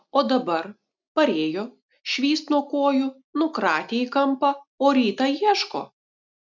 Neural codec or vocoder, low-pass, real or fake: none; 7.2 kHz; real